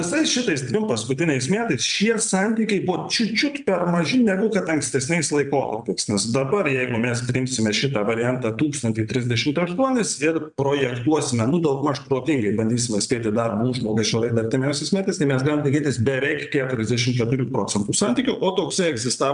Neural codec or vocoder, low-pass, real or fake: vocoder, 22.05 kHz, 80 mel bands, WaveNeXt; 9.9 kHz; fake